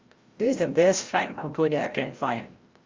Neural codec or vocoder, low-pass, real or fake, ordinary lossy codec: codec, 16 kHz, 0.5 kbps, FreqCodec, larger model; 7.2 kHz; fake; Opus, 32 kbps